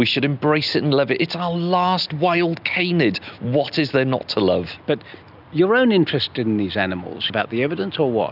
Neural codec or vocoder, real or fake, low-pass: none; real; 5.4 kHz